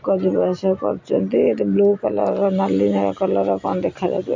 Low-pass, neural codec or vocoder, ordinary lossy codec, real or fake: 7.2 kHz; none; MP3, 48 kbps; real